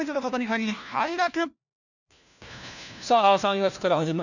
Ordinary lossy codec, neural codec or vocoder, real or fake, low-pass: none; codec, 16 kHz, 1 kbps, FunCodec, trained on LibriTTS, 50 frames a second; fake; 7.2 kHz